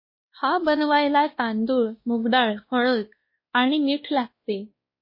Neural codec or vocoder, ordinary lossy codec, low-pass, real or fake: codec, 16 kHz, 1 kbps, X-Codec, WavLM features, trained on Multilingual LibriSpeech; MP3, 24 kbps; 5.4 kHz; fake